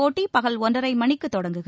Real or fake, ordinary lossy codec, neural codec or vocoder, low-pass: real; none; none; none